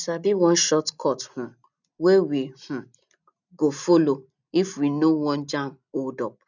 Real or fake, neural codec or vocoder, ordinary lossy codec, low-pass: real; none; none; 7.2 kHz